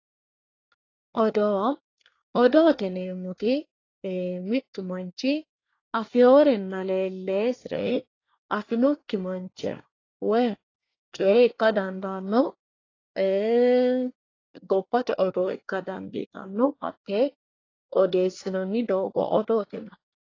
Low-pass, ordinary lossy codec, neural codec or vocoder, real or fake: 7.2 kHz; AAC, 32 kbps; codec, 24 kHz, 1 kbps, SNAC; fake